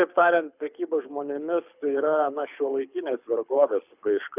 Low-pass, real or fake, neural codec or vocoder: 3.6 kHz; fake; codec, 24 kHz, 6 kbps, HILCodec